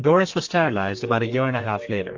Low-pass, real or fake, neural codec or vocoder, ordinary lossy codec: 7.2 kHz; fake; codec, 32 kHz, 1.9 kbps, SNAC; AAC, 48 kbps